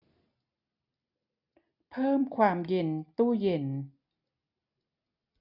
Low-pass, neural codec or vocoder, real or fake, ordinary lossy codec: 5.4 kHz; none; real; none